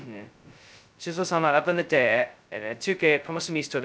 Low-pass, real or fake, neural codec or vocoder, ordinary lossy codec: none; fake; codec, 16 kHz, 0.2 kbps, FocalCodec; none